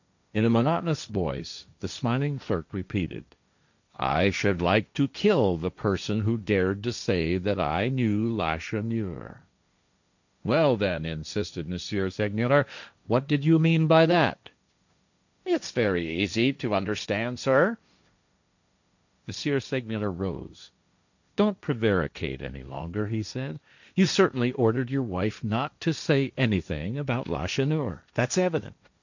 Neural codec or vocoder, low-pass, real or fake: codec, 16 kHz, 1.1 kbps, Voila-Tokenizer; 7.2 kHz; fake